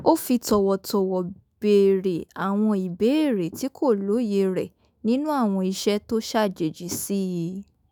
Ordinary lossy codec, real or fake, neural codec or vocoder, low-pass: none; fake; autoencoder, 48 kHz, 128 numbers a frame, DAC-VAE, trained on Japanese speech; none